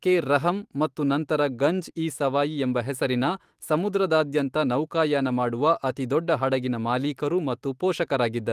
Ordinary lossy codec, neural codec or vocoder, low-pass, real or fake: Opus, 24 kbps; autoencoder, 48 kHz, 128 numbers a frame, DAC-VAE, trained on Japanese speech; 14.4 kHz; fake